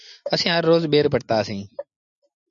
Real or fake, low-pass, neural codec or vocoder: real; 7.2 kHz; none